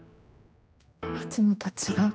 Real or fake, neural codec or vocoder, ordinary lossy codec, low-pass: fake; codec, 16 kHz, 1 kbps, X-Codec, HuBERT features, trained on general audio; none; none